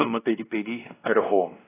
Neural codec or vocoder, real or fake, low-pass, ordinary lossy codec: codec, 16 kHz, 1 kbps, X-Codec, WavLM features, trained on Multilingual LibriSpeech; fake; 3.6 kHz; AAC, 16 kbps